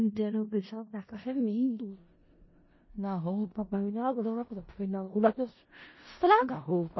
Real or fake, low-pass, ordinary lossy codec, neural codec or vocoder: fake; 7.2 kHz; MP3, 24 kbps; codec, 16 kHz in and 24 kHz out, 0.4 kbps, LongCat-Audio-Codec, four codebook decoder